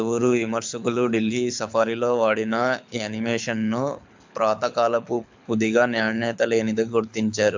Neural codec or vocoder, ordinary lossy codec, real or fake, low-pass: codec, 24 kHz, 6 kbps, HILCodec; MP3, 64 kbps; fake; 7.2 kHz